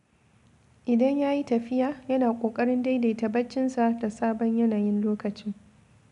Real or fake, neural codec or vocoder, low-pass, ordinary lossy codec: real; none; 10.8 kHz; none